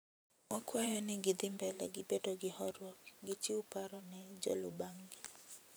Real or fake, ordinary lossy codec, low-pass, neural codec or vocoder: fake; none; none; vocoder, 44.1 kHz, 128 mel bands every 512 samples, BigVGAN v2